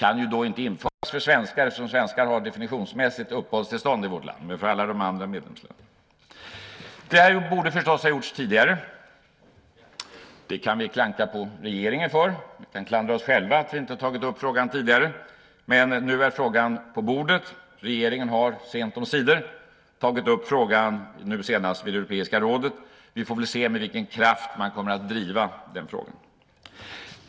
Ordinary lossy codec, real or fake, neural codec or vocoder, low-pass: none; real; none; none